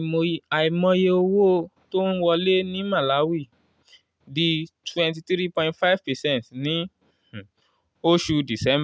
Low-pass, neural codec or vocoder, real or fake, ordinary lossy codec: none; none; real; none